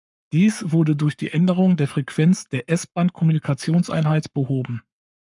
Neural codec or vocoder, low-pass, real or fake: codec, 44.1 kHz, 7.8 kbps, Pupu-Codec; 10.8 kHz; fake